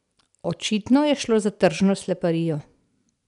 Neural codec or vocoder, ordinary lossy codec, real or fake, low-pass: none; none; real; 10.8 kHz